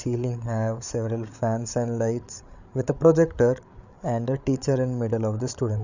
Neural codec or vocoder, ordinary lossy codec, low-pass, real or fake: codec, 16 kHz, 8 kbps, FreqCodec, larger model; none; 7.2 kHz; fake